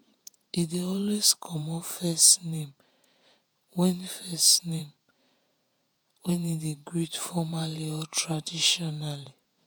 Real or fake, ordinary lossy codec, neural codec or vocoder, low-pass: fake; none; vocoder, 48 kHz, 128 mel bands, Vocos; none